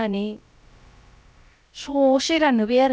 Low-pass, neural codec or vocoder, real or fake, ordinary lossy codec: none; codec, 16 kHz, about 1 kbps, DyCAST, with the encoder's durations; fake; none